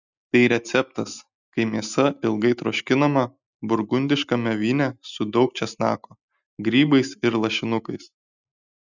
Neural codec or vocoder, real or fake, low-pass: none; real; 7.2 kHz